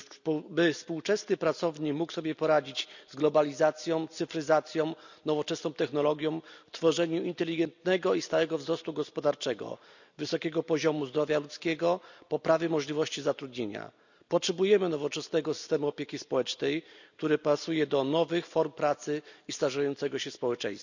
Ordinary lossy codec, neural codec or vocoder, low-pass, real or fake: none; none; 7.2 kHz; real